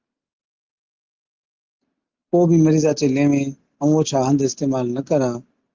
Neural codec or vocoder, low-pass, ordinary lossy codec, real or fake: none; 7.2 kHz; Opus, 24 kbps; real